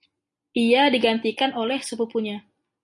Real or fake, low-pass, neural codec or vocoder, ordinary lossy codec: real; 10.8 kHz; none; MP3, 48 kbps